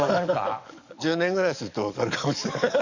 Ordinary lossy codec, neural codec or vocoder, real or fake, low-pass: none; codec, 44.1 kHz, 7.8 kbps, DAC; fake; 7.2 kHz